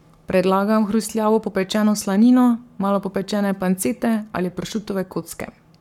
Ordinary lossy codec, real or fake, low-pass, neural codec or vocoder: MP3, 96 kbps; fake; 19.8 kHz; codec, 44.1 kHz, 7.8 kbps, Pupu-Codec